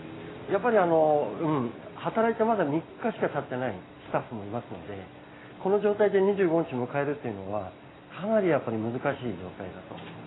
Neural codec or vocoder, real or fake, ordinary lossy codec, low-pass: none; real; AAC, 16 kbps; 7.2 kHz